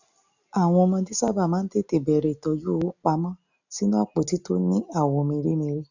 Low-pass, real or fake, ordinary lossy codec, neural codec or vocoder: 7.2 kHz; real; none; none